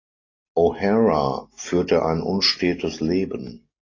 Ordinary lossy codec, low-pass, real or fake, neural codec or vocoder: AAC, 32 kbps; 7.2 kHz; real; none